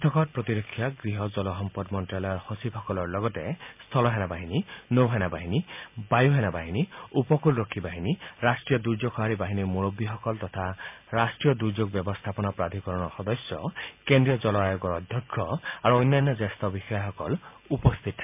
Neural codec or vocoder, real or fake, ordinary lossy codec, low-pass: none; real; MP3, 32 kbps; 3.6 kHz